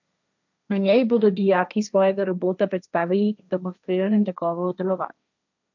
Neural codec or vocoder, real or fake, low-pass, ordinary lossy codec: codec, 16 kHz, 1.1 kbps, Voila-Tokenizer; fake; 7.2 kHz; none